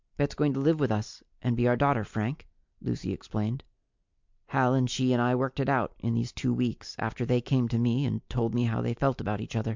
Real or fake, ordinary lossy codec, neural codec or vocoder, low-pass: real; MP3, 64 kbps; none; 7.2 kHz